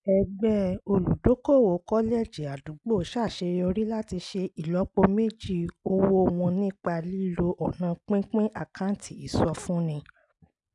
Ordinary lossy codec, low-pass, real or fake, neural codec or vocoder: none; 10.8 kHz; real; none